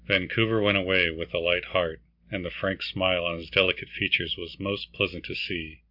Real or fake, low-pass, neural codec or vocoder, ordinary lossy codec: real; 5.4 kHz; none; MP3, 48 kbps